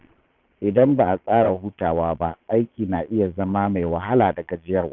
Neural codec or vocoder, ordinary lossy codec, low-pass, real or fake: vocoder, 22.05 kHz, 80 mel bands, Vocos; none; 7.2 kHz; fake